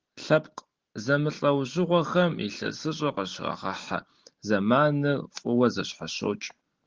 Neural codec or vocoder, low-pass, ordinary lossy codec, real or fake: none; 7.2 kHz; Opus, 16 kbps; real